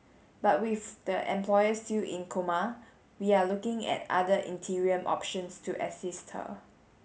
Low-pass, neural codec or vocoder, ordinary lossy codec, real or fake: none; none; none; real